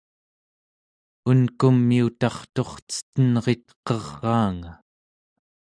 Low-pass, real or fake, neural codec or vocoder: 9.9 kHz; real; none